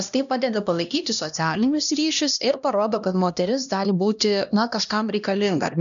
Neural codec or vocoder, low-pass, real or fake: codec, 16 kHz, 1 kbps, X-Codec, HuBERT features, trained on LibriSpeech; 7.2 kHz; fake